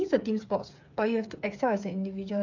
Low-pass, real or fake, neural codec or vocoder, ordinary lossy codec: 7.2 kHz; fake; codec, 16 kHz, 8 kbps, FreqCodec, smaller model; AAC, 48 kbps